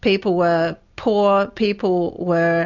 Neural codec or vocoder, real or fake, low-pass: none; real; 7.2 kHz